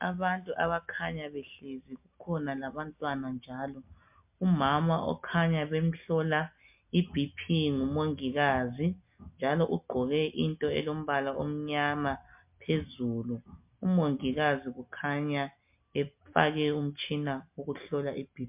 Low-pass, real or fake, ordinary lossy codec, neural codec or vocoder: 3.6 kHz; real; MP3, 32 kbps; none